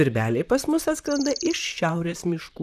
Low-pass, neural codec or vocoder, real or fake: 14.4 kHz; vocoder, 44.1 kHz, 128 mel bands, Pupu-Vocoder; fake